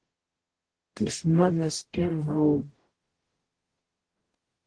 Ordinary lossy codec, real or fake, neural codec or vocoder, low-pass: Opus, 16 kbps; fake; codec, 44.1 kHz, 0.9 kbps, DAC; 9.9 kHz